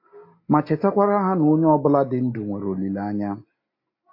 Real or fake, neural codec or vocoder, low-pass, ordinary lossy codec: real; none; 5.4 kHz; AAC, 32 kbps